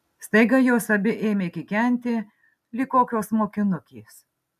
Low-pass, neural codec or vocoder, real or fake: 14.4 kHz; none; real